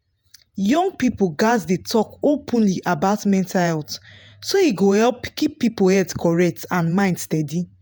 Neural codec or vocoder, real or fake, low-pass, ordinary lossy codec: vocoder, 48 kHz, 128 mel bands, Vocos; fake; none; none